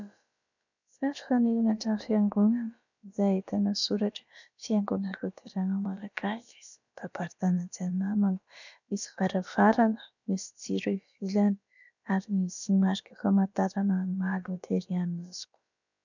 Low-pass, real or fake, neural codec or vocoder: 7.2 kHz; fake; codec, 16 kHz, about 1 kbps, DyCAST, with the encoder's durations